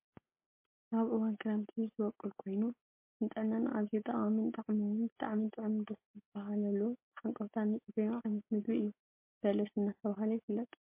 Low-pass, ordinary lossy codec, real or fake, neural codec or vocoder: 3.6 kHz; MP3, 24 kbps; real; none